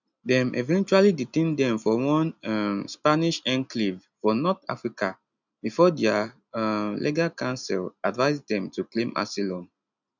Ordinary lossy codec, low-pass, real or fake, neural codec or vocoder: none; 7.2 kHz; real; none